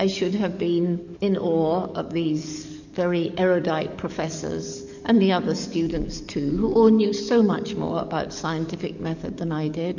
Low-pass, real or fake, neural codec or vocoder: 7.2 kHz; fake; codec, 44.1 kHz, 7.8 kbps, DAC